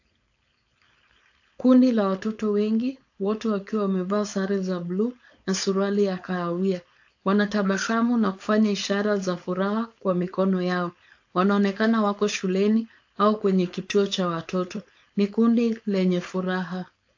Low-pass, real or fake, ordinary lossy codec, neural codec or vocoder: 7.2 kHz; fake; AAC, 48 kbps; codec, 16 kHz, 4.8 kbps, FACodec